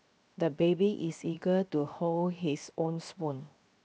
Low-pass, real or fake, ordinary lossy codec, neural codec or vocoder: none; fake; none; codec, 16 kHz, 0.7 kbps, FocalCodec